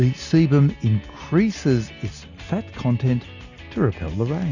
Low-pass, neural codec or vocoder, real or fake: 7.2 kHz; none; real